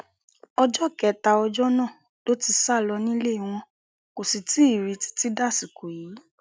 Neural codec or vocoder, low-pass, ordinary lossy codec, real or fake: none; none; none; real